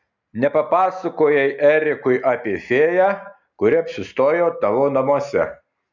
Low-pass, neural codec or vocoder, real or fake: 7.2 kHz; none; real